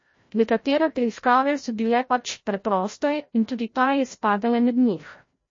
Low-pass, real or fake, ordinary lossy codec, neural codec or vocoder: 7.2 kHz; fake; MP3, 32 kbps; codec, 16 kHz, 0.5 kbps, FreqCodec, larger model